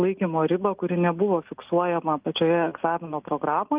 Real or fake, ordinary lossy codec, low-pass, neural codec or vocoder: real; Opus, 24 kbps; 3.6 kHz; none